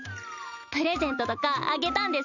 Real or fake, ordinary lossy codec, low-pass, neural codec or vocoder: real; none; 7.2 kHz; none